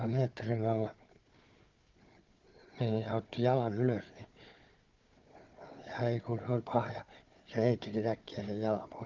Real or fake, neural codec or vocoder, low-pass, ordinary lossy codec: fake; codec, 16 kHz, 4 kbps, FunCodec, trained on Chinese and English, 50 frames a second; 7.2 kHz; Opus, 32 kbps